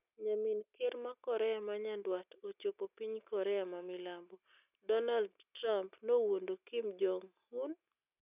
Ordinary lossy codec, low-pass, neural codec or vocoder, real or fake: none; 3.6 kHz; none; real